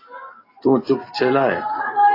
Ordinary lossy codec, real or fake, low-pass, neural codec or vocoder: AAC, 24 kbps; real; 5.4 kHz; none